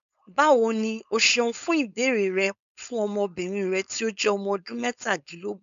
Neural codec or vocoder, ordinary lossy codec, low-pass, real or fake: codec, 16 kHz, 4.8 kbps, FACodec; MP3, 64 kbps; 7.2 kHz; fake